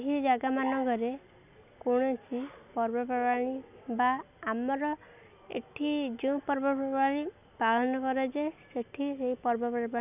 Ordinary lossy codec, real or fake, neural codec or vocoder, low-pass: none; real; none; 3.6 kHz